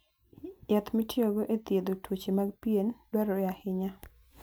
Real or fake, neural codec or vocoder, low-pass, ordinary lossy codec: real; none; none; none